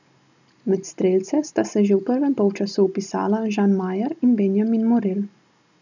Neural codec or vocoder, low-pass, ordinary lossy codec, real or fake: none; 7.2 kHz; none; real